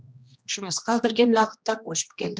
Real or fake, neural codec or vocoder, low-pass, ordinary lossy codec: fake; codec, 16 kHz, 1 kbps, X-Codec, HuBERT features, trained on general audio; none; none